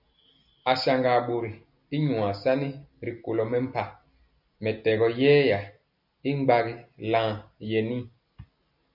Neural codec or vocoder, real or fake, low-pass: none; real; 5.4 kHz